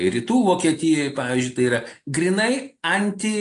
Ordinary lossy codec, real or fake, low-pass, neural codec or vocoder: AAC, 48 kbps; real; 10.8 kHz; none